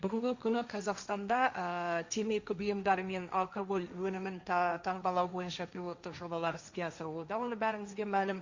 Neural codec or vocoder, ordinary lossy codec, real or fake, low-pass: codec, 16 kHz, 1.1 kbps, Voila-Tokenizer; Opus, 64 kbps; fake; 7.2 kHz